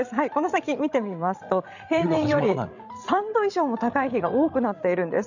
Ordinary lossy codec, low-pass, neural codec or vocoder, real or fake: none; 7.2 kHz; codec, 16 kHz, 16 kbps, FreqCodec, larger model; fake